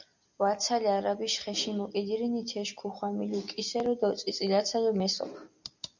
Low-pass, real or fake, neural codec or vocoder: 7.2 kHz; real; none